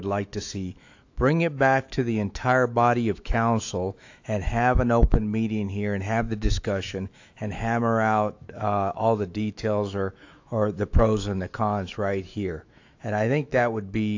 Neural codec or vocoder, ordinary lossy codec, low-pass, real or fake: autoencoder, 48 kHz, 128 numbers a frame, DAC-VAE, trained on Japanese speech; AAC, 48 kbps; 7.2 kHz; fake